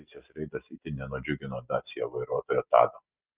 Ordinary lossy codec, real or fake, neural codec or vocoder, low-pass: Opus, 24 kbps; real; none; 3.6 kHz